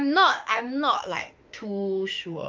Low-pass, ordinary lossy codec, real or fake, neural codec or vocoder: 7.2 kHz; Opus, 24 kbps; fake; autoencoder, 48 kHz, 32 numbers a frame, DAC-VAE, trained on Japanese speech